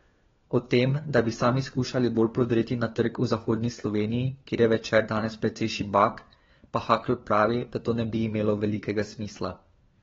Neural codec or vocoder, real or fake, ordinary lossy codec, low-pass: codec, 16 kHz, 4 kbps, FunCodec, trained on LibriTTS, 50 frames a second; fake; AAC, 24 kbps; 7.2 kHz